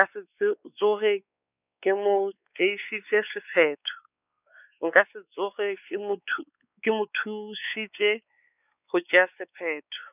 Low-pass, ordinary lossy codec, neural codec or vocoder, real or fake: 3.6 kHz; none; codec, 16 kHz, 4 kbps, X-Codec, WavLM features, trained on Multilingual LibriSpeech; fake